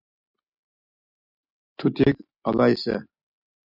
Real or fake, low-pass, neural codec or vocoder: real; 5.4 kHz; none